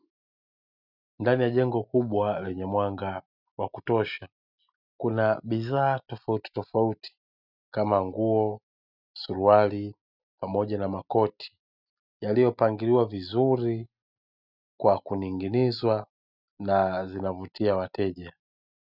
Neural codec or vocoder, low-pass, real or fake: none; 5.4 kHz; real